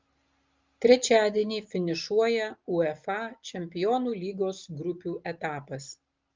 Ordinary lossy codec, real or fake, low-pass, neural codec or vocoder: Opus, 32 kbps; real; 7.2 kHz; none